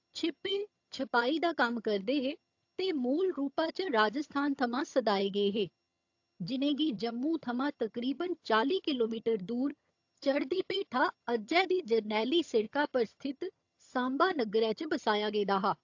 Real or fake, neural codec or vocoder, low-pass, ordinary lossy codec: fake; vocoder, 22.05 kHz, 80 mel bands, HiFi-GAN; 7.2 kHz; AAC, 48 kbps